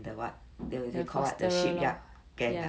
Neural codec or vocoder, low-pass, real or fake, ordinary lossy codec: none; none; real; none